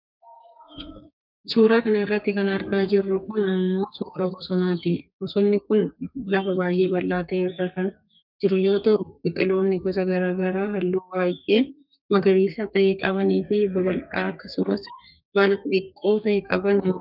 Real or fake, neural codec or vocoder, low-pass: fake; codec, 32 kHz, 1.9 kbps, SNAC; 5.4 kHz